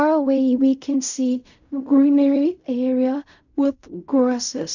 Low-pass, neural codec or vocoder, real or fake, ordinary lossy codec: 7.2 kHz; codec, 16 kHz in and 24 kHz out, 0.4 kbps, LongCat-Audio-Codec, fine tuned four codebook decoder; fake; none